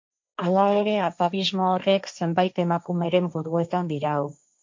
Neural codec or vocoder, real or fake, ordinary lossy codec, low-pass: codec, 16 kHz, 1.1 kbps, Voila-Tokenizer; fake; MP3, 48 kbps; 7.2 kHz